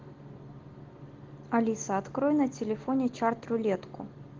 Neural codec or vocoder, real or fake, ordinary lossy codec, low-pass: none; real; Opus, 16 kbps; 7.2 kHz